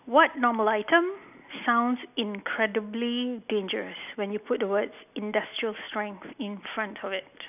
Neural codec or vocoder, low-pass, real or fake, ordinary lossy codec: none; 3.6 kHz; real; none